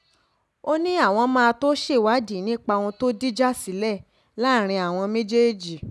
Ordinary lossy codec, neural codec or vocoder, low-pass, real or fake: none; none; none; real